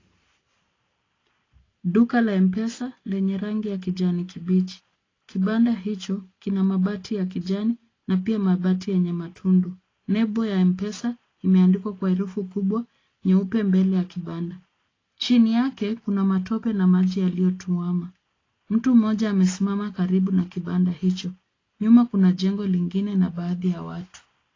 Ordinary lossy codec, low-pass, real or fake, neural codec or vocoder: AAC, 32 kbps; 7.2 kHz; real; none